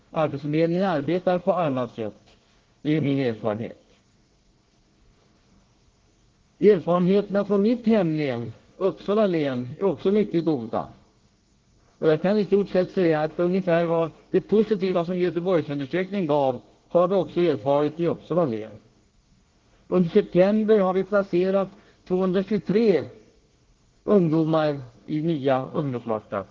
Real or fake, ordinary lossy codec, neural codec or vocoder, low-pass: fake; Opus, 16 kbps; codec, 24 kHz, 1 kbps, SNAC; 7.2 kHz